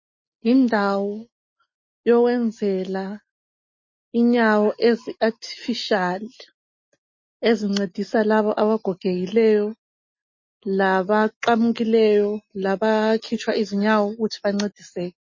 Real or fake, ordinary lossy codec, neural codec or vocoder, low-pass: real; MP3, 32 kbps; none; 7.2 kHz